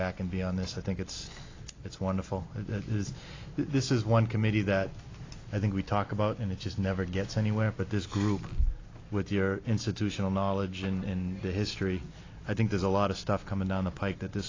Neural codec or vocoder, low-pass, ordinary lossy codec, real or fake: none; 7.2 kHz; AAC, 32 kbps; real